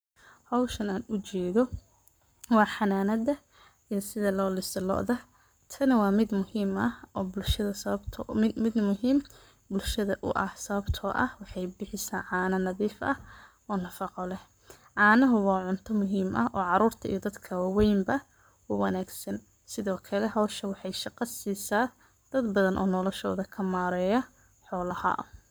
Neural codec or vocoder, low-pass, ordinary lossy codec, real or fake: codec, 44.1 kHz, 7.8 kbps, Pupu-Codec; none; none; fake